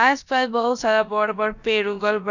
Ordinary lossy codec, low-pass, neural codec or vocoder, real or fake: none; 7.2 kHz; codec, 16 kHz, about 1 kbps, DyCAST, with the encoder's durations; fake